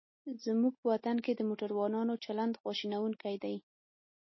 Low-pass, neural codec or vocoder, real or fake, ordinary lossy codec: 7.2 kHz; none; real; MP3, 24 kbps